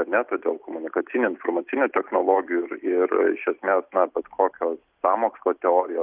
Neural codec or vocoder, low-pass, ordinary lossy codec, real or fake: none; 3.6 kHz; Opus, 32 kbps; real